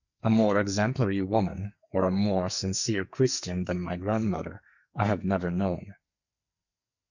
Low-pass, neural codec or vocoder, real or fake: 7.2 kHz; codec, 44.1 kHz, 2.6 kbps, SNAC; fake